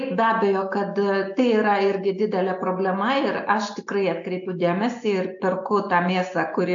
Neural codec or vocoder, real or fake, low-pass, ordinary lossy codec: none; real; 7.2 kHz; AAC, 64 kbps